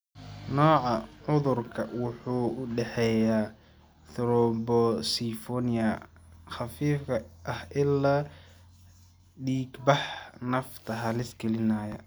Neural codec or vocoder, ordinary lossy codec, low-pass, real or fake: none; none; none; real